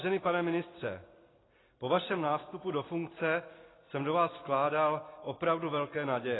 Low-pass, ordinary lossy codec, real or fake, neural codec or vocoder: 7.2 kHz; AAC, 16 kbps; real; none